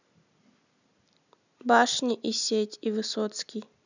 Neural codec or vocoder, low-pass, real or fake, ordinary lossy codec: none; 7.2 kHz; real; none